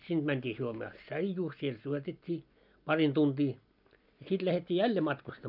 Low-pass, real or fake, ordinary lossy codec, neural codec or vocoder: 5.4 kHz; real; none; none